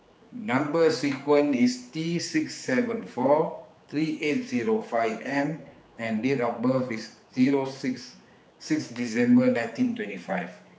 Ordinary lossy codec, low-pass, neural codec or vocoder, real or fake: none; none; codec, 16 kHz, 4 kbps, X-Codec, HuBERT features, trained on general audio; fake